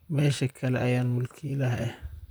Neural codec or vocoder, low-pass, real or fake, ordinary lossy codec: vocoder, 44.1 kHz, 128 mel bands, Pupu-Vocoder; none; fake; none